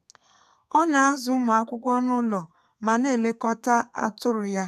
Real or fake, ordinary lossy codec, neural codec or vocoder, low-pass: fake; none; codec, 32 kHz, 1.9 kbps, SNAC; 14.4 kHz